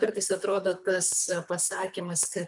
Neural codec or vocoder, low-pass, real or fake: codec, 24 kHz, 3 kbps, HILCodec; 10.8 kHz; fake